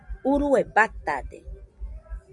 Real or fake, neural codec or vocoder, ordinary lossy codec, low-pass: real; none; Opus, 64 kbps; 10.8 kHz